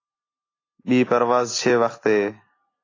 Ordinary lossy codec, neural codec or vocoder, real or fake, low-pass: AAC, 32 kbps; none; real; 7.2 kHz